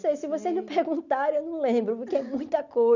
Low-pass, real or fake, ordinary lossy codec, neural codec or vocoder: 7.2 kHz; real; none; none